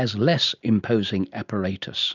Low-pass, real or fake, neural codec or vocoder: 7.2 kHz; real; none